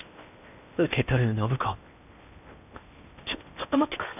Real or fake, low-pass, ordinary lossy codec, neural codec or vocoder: fake; 3.6 kHz; none; codec, 16 kHz in and 24 kHz out, 0.8 kbps, FocalCodec, streaming, 65536 codes